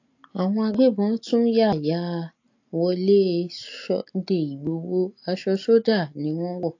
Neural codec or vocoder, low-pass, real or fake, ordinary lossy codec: none; 7.2 kHz; real; AAC, 48 kbps